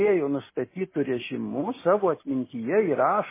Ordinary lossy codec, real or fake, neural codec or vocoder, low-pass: MP3, 16 kbps; real; none; 3.6 kHz